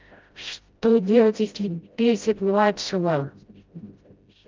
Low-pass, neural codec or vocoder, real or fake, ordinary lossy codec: 7.2 kHz; codec, 16 kHz, 0.5 kbps, FreqCodec, smaller model; fake; Opus, 24 kbps